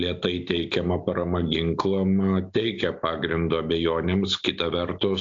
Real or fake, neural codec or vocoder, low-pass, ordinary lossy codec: real; none; 7.2 kHz; AAC, 64 kbps